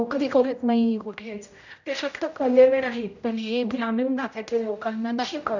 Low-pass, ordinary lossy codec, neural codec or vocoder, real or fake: 7.2 kHz; none; codec, 16 kHz, 0.5 kbps, X-Codec, HuBERT features, trained on general audio; fake